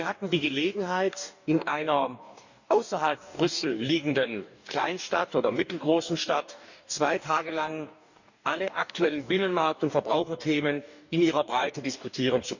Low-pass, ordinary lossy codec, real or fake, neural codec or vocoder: 7.2 kHz; none; fake; codec, 44.1 kHz, 2.6 kbps, DAC